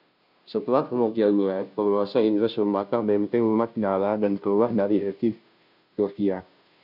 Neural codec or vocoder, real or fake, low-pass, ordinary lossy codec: codec, 16 kHz, 0.5 kbps, FunCodec, trained on Chinese and English, 25 frames a second; fake; 5.4 kHz; MP3, 48 kbps